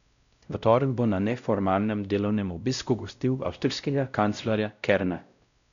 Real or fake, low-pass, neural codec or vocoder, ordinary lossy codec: fake; 7.2 kHz; codec, 16 kHz, 0.5 kbps, X-Codec, WavLM features, trained on Multilingual LibriSpeech; none